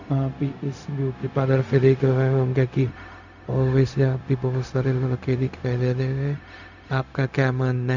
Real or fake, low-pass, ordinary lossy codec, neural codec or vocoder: fake; 7.2 kHz; none; codec, 16 kHz, 0.4 kbps, LongCat-Audio-Codec